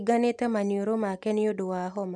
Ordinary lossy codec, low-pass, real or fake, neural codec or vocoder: none; none; real; none